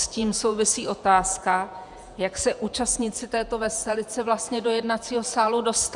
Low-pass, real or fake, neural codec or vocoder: 10.8 kHz; fake; vocoder, 48 kHz, 128 mel bands, Vocos